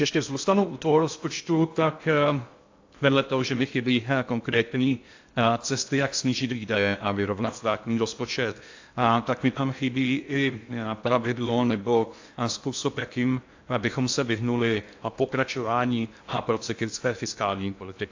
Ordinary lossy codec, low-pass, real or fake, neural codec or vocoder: AAC, 48 kbps; 7.2 kHz; fake; codec, 16 kHz in and 24 kHz out, 0.6 kbps, FocalCodec, streaming, 2048 codes